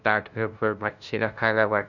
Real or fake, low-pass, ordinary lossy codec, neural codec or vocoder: fake; 7.2 kHz; none; codec, 16 kHz, 0.5 kbps, FunCodec, trained on LibriTTS, 25 frames a second